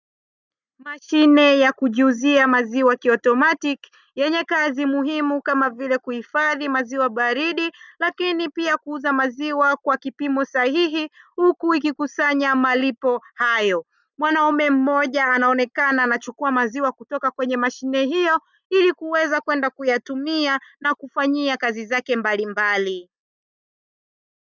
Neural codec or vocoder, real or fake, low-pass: none; real; 7.2 kHz